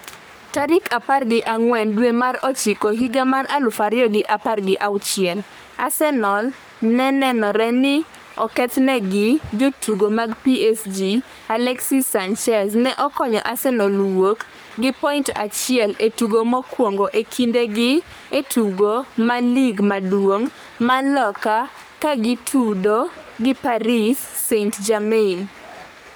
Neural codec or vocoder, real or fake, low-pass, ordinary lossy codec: codec, 44.1 kHz, 3.4 kbps, Pupu-Codec; fake; none; none